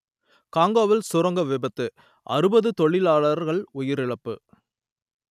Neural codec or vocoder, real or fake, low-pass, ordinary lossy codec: none; real; 14.4 kHz; none